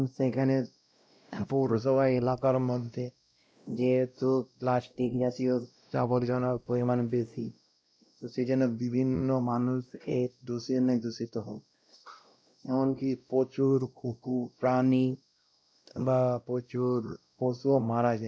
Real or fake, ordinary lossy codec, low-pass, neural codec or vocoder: fake; none; none; codec, 16 kHz, 0.5 kbps, X-Codec, WavLM features, trained on Multilingual LibriSpeech